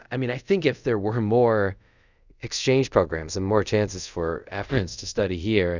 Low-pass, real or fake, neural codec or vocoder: 7.2 kHz; fake; codec, 24 kHz, 0.5 kbps, DualCodec